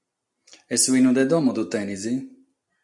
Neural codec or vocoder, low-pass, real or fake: none; 10.8 kHz; real